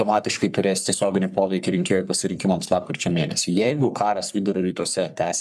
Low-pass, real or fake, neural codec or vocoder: 14.4 kHz; fake; codec, 44.1 kHz, 3.4 kbps, Pupu-Codec